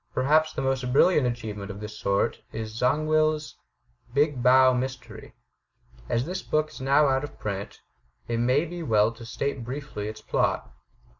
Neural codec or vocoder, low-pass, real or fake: none; 7.2 kHz; real